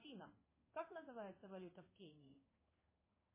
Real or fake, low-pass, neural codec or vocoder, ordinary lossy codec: fake; 3.6 kHz; codec, 16 kHz in and 24 kHz out, 1 kbps, XY-Tokenizer; MP3, 16 kbps